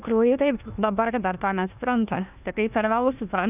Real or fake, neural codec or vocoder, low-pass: fake; codec, 16 kHz, 1 kbps, FunCodec, trained on Chinese and English, 50 frames a second; 3.6 kHz